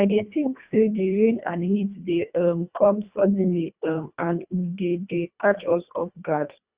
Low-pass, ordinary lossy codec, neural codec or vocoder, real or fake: 3.6 kHz; Opus, 64 kbps; codec, 24 kHz, 1.5 kbps, HILCodec; fake